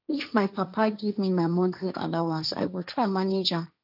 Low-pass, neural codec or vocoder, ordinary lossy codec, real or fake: 5.4 kHz; codec, 16 kHz, 1.1 kbps, Voila-Tokenizer; none; fake